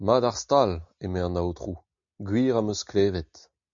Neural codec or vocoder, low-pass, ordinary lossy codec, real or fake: none; 7.2 kHz; MP3, 64 kbps; real